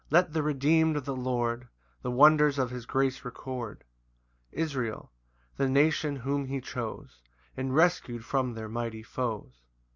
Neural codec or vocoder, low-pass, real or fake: none; 7.2 kHz; real